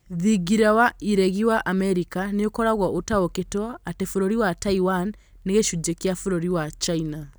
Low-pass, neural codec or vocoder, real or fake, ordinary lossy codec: none; none; real; none